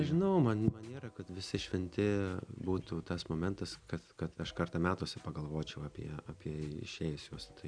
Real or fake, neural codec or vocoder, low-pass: real; none; 9.9 kHz